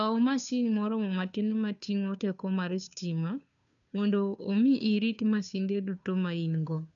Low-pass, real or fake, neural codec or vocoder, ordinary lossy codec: 7.2 kHz; fake; codec, 16 kHz, 2 kbps, FunCodec, trained on Chinese and English, 25 frames a second; none